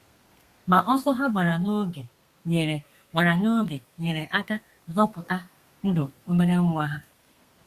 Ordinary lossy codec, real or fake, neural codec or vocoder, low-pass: Opus, 64 kbps; fake; codec, 32 kHz, 1.9 kbps, SNAC; 14.4 kHz